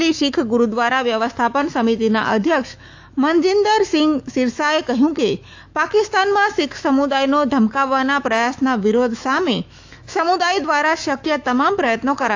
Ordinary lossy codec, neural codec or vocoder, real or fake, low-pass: AAC, 48 kbps; autoencoder, 48 kHz, 128 numbers a frame, DAC-VAE, trained on Japanese speech; fake; 7.2 kHz